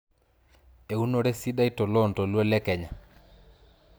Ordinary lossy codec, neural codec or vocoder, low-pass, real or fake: none; none; none; real